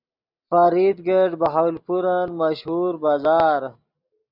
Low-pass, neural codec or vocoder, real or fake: 5.4 kHz; none; real